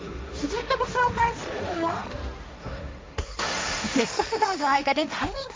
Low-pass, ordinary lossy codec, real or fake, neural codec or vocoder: none; none; fake; codec, 16 kHz, 1.1 kbps, Voila-Tokenizer